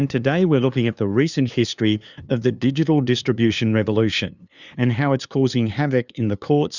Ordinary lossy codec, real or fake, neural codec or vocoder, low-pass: Opus, 64 kbps; fake; codec, 16 kHz, 2 kbps, FunCodec, trained on LibriTTS, 25 frames a second; 7.2 kHz